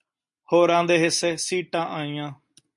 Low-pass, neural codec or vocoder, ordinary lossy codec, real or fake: 10.8 kHz; none; MP3, 64 kbps; real